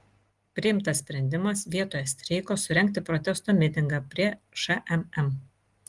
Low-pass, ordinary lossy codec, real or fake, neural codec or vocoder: 10.8 kHz; Opus, 24 kbps; real; none